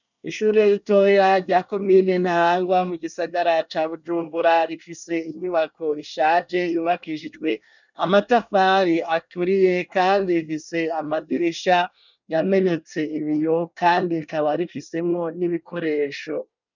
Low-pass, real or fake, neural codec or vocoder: 7.2 kHz; fake; codec, 24 kHz, 1 kbps, SNAC